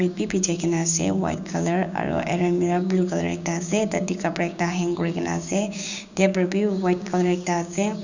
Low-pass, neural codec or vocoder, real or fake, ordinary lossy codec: 7.2 kHz; codec, 16 kHz, 6 kbps, DAC; fake; none